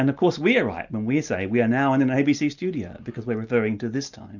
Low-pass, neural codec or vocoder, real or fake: 7.2 kHz; none; real